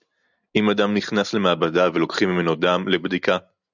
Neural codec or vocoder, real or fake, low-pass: none; real; 7.2 kHz